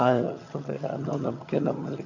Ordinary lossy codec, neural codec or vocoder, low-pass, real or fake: none; vocoder, 22.05 kHz, 80 mel bands, HiFi-GAN; 7.2 kHz; fake